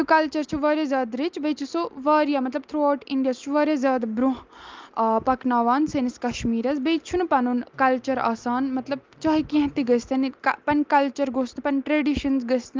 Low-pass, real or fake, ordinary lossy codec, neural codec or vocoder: 7.2 kHz; real; Opus, 32 kbps; none